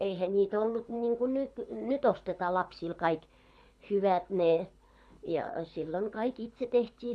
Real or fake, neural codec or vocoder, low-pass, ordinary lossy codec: fake; codec, 24 kHz, 6 kbps, HILCodec; none; none